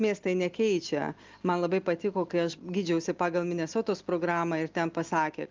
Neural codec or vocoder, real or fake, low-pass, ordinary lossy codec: none; real; 7.2 kHz; Opus, 24 kbps